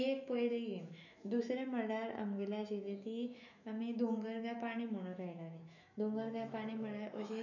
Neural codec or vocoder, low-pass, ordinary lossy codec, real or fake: autoencoder, 48 kHz, 128 numbers a frame, DAC-VAE, trained on Japanese speech; 7.2 kHz; none; fake